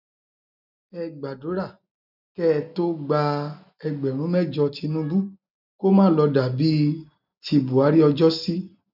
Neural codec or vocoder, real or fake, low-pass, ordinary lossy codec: none; real; 5.4 kHz; none